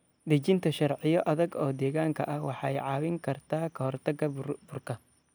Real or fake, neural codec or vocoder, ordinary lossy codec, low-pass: real; none; none; none